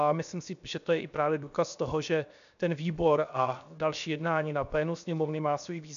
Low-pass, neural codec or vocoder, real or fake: 7.2 kHz; codec, 16 kHz, 0.7 kbps, FocalCodec; fake